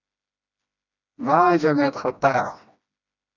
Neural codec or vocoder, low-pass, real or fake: codec, 16 kHz, 1 kbps, FreqCodec, smaller model; 7.2 kHz; fake